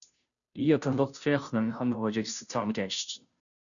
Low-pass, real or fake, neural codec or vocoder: 7.2 kHz; fake; codec, 16 kHz, 0.5 kbps, FunCodec, trained on Chinese and English, 25 frames a second